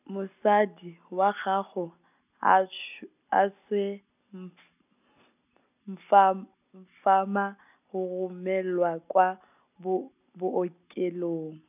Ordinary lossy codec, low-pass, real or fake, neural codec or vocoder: none; 3.6 kHz; real; none